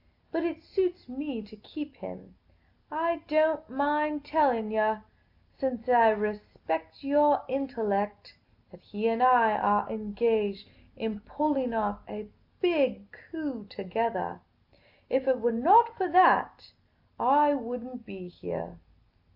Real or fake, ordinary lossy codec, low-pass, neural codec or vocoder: real; AAC, 48 kbps; 5.4 kHz; none